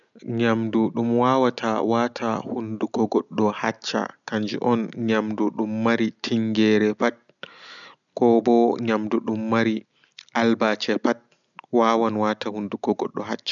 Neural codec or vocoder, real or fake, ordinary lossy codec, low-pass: none; real; none; 7.2 kHz